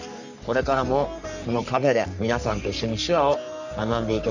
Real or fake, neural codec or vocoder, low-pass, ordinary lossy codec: fake; codec, 44.1 kHz, 3.4 kbps, Pupu-Codec; 7.2 kHz; none